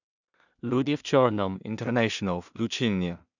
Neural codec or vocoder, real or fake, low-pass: codec, 16 kHz in and 24 kHz out, 0.4 kbps, LongCat-Audio-Codec, two codebook decoder; fake; 7.2 kHz